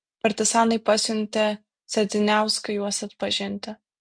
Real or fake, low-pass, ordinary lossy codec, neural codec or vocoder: real; 9.9 kHz; MP3, 64 kbps; none